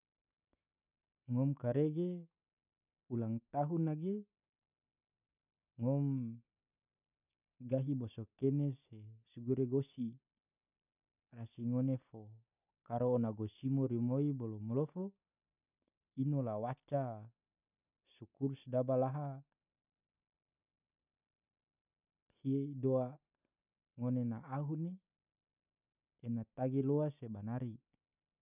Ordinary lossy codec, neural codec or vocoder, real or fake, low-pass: none; none; real; 3.6 kHz